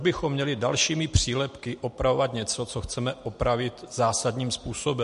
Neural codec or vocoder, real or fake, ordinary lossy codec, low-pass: none; real; MP3, 48 kbps; 14.4 kHz